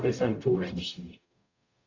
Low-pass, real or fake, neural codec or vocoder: 7.2 kHz; fake; codec, 44.1 kHz, 0.9 kbps, DAC